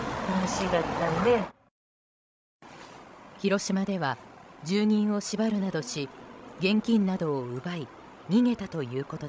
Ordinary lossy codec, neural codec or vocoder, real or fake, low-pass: none; codec, 16 kHz, 16 kbps, FreqCodec, larger model; fake; none